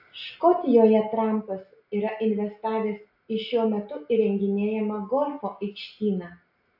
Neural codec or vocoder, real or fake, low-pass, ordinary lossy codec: none; real; 5.4 kHz; AAC, 48 kbps